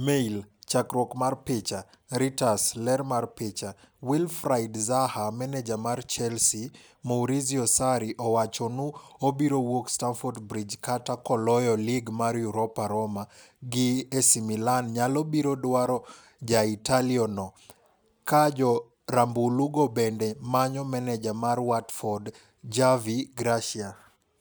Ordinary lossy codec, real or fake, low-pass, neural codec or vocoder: none; real; none; none